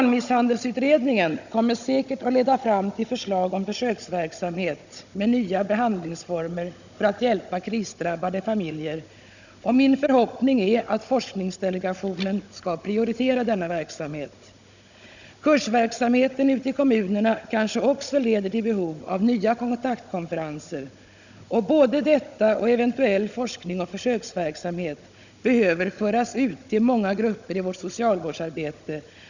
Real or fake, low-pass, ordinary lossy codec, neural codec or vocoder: fake; 7.2 kHz; none; codec, 16 kHz, 16 kbps, FunCodec, trained on Chinese and English, 50 frames a second